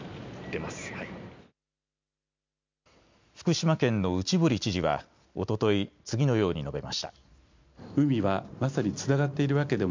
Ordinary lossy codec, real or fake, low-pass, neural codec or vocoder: MP3, 64 kbps; real; 7.2 kHz; none